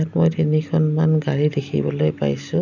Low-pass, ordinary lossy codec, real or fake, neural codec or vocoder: 7.2 kHz; none; real; none